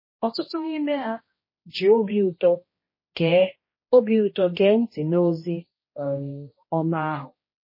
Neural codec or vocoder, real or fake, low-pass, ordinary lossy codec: codec, 16 kHz, 1 kbps, X-Codec, HuBERT features, trained on general audio; fake; 5.4 kHz; MP3, 24 kbps